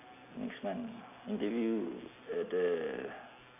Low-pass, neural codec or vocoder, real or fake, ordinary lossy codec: 3.6 kHz; none; real; MP3, 32 kbps